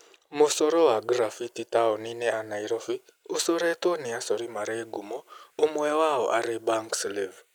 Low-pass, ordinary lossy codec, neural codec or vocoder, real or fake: none; none; none; real